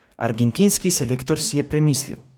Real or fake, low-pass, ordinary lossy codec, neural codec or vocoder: fake; 19.8 kHz; none; codec, 44.1 kHz, 2.6 kbps, DAC